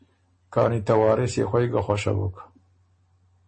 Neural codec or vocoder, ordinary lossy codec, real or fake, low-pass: none; MP3, 32 kbps; real; 10.8 kHz